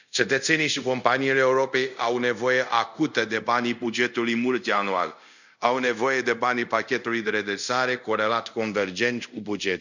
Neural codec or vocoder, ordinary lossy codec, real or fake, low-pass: codec, 24 kHz, 0.5 kbps, DualCodec; none; fake; 7.2 kHz